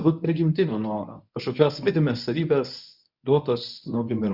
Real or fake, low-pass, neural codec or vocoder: fake; 5.4 kHz; codec, 24 kHz, 0.9 kbps, WavTokenizer, medium speech release version 1